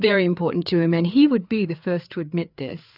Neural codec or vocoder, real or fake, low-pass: codec, 16 kHz, 4 kbps, FreqCodec, larger model; fake; 5.4 kHz